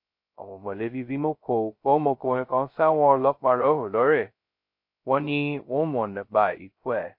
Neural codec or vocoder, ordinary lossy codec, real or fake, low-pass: codec, 16 kHz, 0.2 kbps, FocalCodec; MP3, 32 kbps; fake; 5.4 kHz